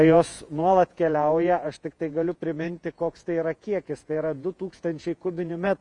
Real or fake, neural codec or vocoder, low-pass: fake; vocoder, 48 kHz, 128 mel bands, Vocos; 10.8 kHz